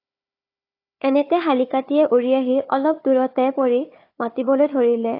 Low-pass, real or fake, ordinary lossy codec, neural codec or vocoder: 5.4 kHz; fake; AAC, 32 kbps; codec, 16 kHz, 4 kbps, FunCodec, trained on Chinese and English, 50 frames a second